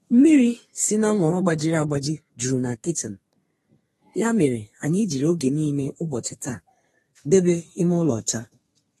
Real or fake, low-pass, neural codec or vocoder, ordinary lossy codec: fake; 14.4 kHz; codec, 32 kHz, 1.9 kbps, SNAC; AAC, 32 kbps